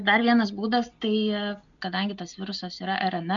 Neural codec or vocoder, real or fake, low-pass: none; real; 7.2 kHz